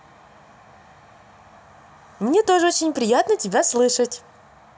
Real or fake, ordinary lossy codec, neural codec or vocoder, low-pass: real; none; none; none